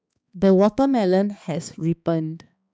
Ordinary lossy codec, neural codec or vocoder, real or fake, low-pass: none; codec, 16 kHz, 2 kbps, X-Codec, HuBERT features, trained on balanced general audio; fake; none